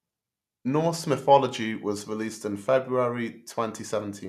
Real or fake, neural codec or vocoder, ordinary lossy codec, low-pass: real; none; none; 14.4 kHz